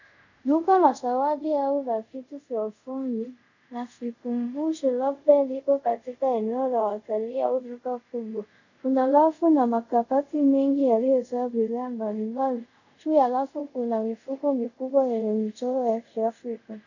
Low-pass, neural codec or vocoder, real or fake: 7.2 kHz; codec, 24 kHz, 0.5 kbps, DualCodec; fake